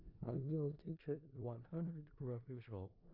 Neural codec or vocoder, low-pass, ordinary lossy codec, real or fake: codec, 16 kHz in and 24 kHz out, 0.4 kbps, LongCat-Audio-Codec, four codebook decoder; 5.4 kHz; AAC, 48 kbps; fake